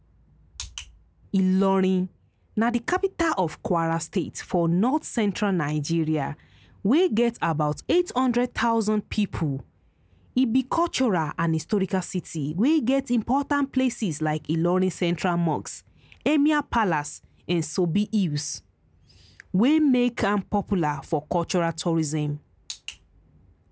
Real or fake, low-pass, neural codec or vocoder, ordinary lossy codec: real; none; none; none